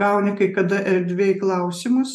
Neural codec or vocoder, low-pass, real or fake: none; 14.4 kHz; real